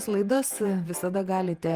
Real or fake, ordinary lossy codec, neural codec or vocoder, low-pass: fake; Opus, 32 kbps; vocoder, 48 kHz, 128 mel bands, Vocos; 14.4 kHz